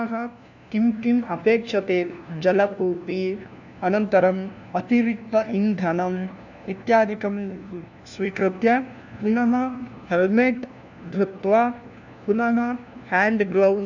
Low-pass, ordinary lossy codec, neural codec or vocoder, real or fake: 7.2 kHz; none; codec, 16 kHz, 1 kbps, FunCodec, trained on LibriTTS, 50 frames a second; fake